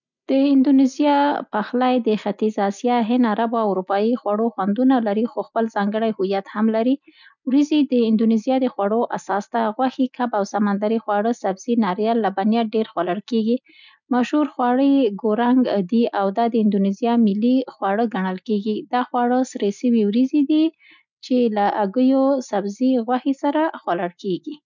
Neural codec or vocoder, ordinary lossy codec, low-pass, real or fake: none; none; 7.2 kHz; real